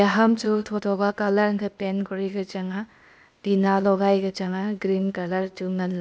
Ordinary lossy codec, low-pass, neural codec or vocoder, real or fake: none; none; codec, 16 kHz, 0.8 kbps, ZipCodec; fake